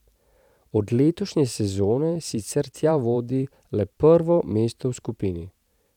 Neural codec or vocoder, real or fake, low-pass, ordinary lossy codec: vocoder, 44.1 kHz, 128 mel bands every 512 samples, BigVGAN v2; fake; 19.8 kHz; none